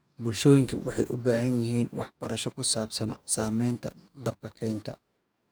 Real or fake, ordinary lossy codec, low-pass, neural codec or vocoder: fake; none; none; codec, 44.1 kHz, 2.6 kbps, DAC